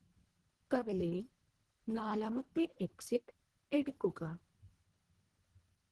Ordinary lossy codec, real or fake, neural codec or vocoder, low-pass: Opus, 16 kbps; fake; codec, 24 kHz, 1.5 kbps, HILCodec; 10.8 kHz